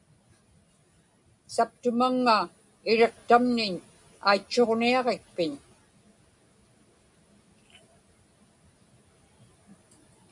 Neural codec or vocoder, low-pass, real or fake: none; 10.8 kHz; real